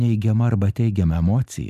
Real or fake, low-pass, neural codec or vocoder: real; 14.4 kHz; none